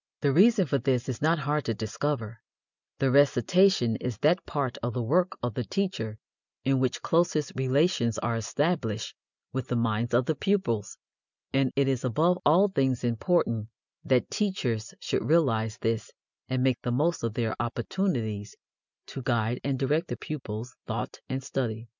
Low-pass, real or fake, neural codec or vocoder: 7.2 kHz; real; none